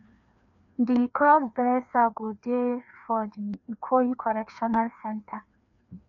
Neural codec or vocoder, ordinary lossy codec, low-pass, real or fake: codec, 16 kHz, 2 kbps, FreqCodec, larger model; none; 7.2 kHz; fake